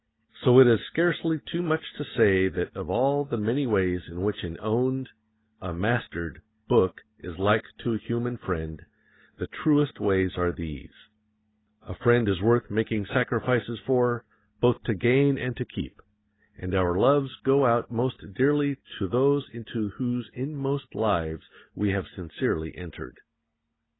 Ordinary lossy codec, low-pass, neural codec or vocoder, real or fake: AAC, 16 kbps; 7.2 kHz; none; real